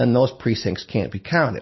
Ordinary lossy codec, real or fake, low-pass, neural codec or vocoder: MP3, 24 kbps; real; 7.2 kHz; none